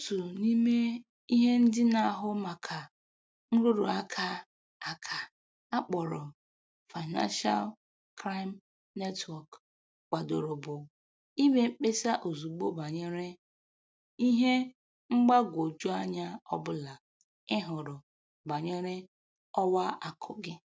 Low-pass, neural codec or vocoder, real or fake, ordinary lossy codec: none; none; real; none